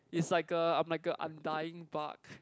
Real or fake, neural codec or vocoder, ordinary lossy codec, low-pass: real; none; none; none